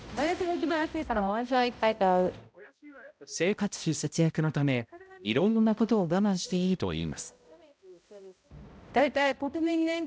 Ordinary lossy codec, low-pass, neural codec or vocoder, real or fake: none; none; codec, 16 kHz, 0.5 kbps, X-Codec, HuBERT features, trained on balanced general audio; fake